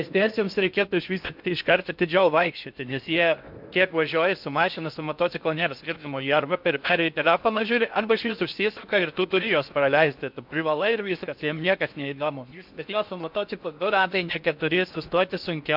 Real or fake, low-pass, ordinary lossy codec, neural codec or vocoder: fake; 5.4 kHz; MP3, 48 kbps; codec, 16 kHz in and 24 kHz out, 0.6 kbps, FocalCodec, streaming, 4096 codes